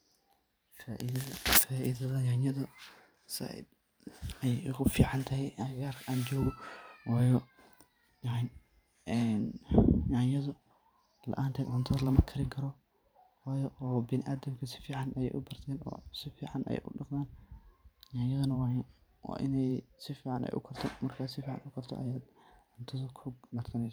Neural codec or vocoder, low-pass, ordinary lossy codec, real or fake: vocoder, 44.1 kHz, 128 mel bands every 512 samples, BigVGAN v2; none; none; fake